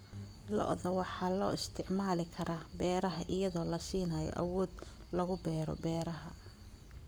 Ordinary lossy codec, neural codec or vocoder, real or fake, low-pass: none; codec, 44.1 kHz, 7.8 kbps, Pupu-Codec; fake; none